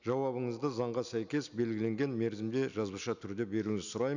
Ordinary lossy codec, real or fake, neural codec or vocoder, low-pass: none; real; none; 7.2 kHz